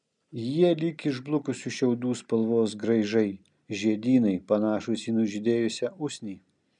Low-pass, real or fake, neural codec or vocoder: 9.9 kHz; real; none